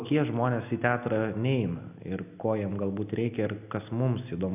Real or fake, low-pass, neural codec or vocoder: real; 3.6 kHz; none